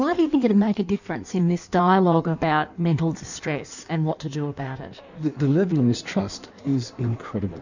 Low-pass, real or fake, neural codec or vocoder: 7.2 kHz; fake; codec, 16 kHz in and 24 kHz out, 1.1 kbps, FireRedTTS-2 codec